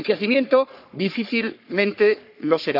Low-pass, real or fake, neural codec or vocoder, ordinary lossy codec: 5.4 kHz; fake; codec, 44.1 kHz, 3.4 kbps, Pupu-Codec; none